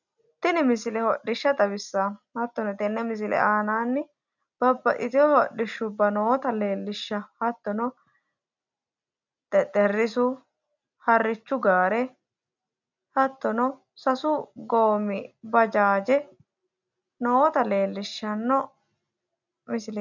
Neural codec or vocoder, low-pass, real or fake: none; 7.2 kHz; real